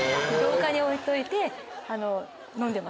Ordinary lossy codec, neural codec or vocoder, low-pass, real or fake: none; none; none; real